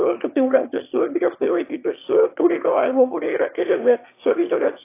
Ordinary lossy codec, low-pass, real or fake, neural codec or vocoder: AAC, 24 kbps; 3.6 kHz; fake; autoencoder, 22.05 kHz, a latent of 192 numbers a frame, VITS, trained on one speaker